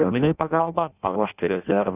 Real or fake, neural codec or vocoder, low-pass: fake; codec, 16 kHz in and 24 kHz out, 0.6 kbps, FireRedTTS-2 codec; 3.6 kHz